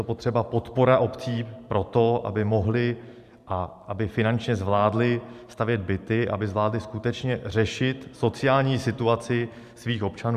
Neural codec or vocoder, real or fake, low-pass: vocoder, 44.1 kHz, 128 mel bands every 512 samples, BigVGAN v2; fake; 14.4 kHz